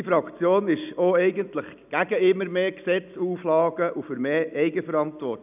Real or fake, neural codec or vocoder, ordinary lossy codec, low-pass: real; none; none; 3.6 kHz